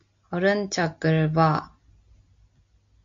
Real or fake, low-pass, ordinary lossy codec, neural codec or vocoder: real; 7.2 kHz; MP3, 48 kbps; none